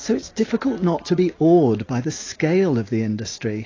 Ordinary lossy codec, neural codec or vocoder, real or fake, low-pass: AAC, 32 kbps; none; real; 7.2 kHz